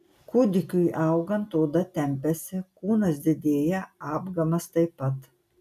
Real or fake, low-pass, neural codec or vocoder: real; 14.4 kHz; none